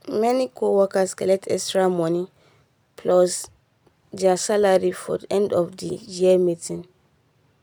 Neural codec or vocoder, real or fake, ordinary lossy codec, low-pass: none; real; none; none